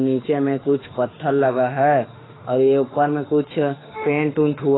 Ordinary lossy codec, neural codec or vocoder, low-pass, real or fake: AAC, 16 kbps; autoencoder, 48 kHz, 32 numbers a frame, DAC-VAE, trained on Japanese speech; 7.2 kHz; fake